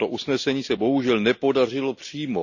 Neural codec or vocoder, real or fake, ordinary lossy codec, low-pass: none; real; none; 7.2 kHz